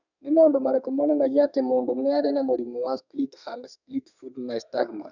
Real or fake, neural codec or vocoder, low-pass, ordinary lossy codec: fake; codec, 32 kHz, 1.9 kbps, SNAC; 7.2 kHz; none